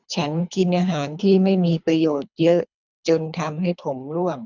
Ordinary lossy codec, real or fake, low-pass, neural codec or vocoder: none; fake; 7.2 kHz; codec, 24 kHz, 3 kbps, HILCodec